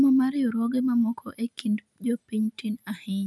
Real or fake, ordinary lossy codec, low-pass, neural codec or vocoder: real; none; none; none